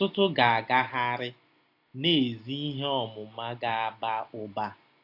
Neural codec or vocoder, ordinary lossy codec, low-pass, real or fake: none; AAC, 32 kbps; 5.4 kHz; real